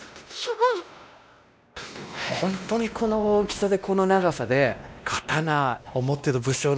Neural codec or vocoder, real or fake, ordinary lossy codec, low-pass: codec, 16 kHz, 1 kbps, X-Codec, WavLM features, trained on Multilingual LibriSpeech; fake; none; none